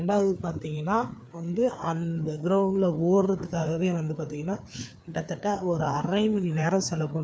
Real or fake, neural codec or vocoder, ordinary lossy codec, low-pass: fake; codec, 16 kHz, 4 kbps, FreqCodec, larger model; none; none